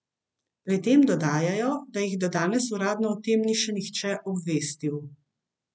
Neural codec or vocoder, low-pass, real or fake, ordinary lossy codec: none; none; real; none